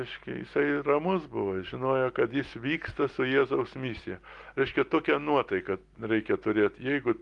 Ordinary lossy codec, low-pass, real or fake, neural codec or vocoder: Opus, 24 kbps; 10.8 kHz; real; none